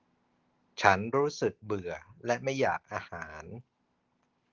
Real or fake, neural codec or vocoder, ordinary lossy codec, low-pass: real; none; Opus, 16 kbps; 7.2 kHz